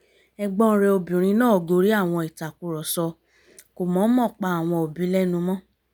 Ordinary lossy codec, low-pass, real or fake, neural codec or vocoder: none; none; real; none